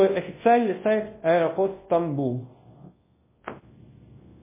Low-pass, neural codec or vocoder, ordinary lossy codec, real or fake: 3.6 kHz; codec, 24 kHz, 0.9 kbps, WavTokenizer, large speech release; MP3, 16 kbps; fake